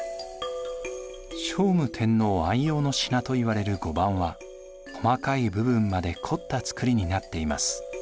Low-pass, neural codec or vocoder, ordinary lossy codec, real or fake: none; none; none; real